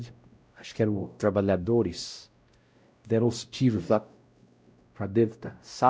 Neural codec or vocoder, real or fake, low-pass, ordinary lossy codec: codec, 16 kHz, 0.5 kbps, X-Codec, WavLM features, trained on Multilingual LibriSpeech; fake; none; none